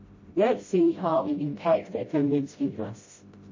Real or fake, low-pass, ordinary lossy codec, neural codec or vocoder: fake; 7.2 kHz; MP3, 32 kbps; codec, 16 kHz, 0.5 kbps, FreqCodec, smaller model